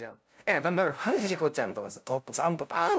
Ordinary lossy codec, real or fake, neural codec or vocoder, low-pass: none; fake; codec, 16 kHz, 0.5 kbps, FunCodec, trained on LibriTTS, 25 frames a second; none